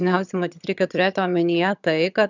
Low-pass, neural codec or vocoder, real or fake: 7.2 kHz; vocoder, 22.05 kHz, 80 mel bands, HiFi-GAN; fake